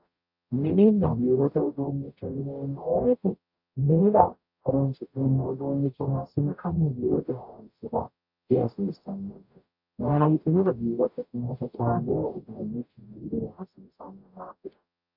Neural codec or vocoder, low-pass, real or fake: codec, 44.1 kHz, 0.9 kbps, DAC; 5.4 kHz; fake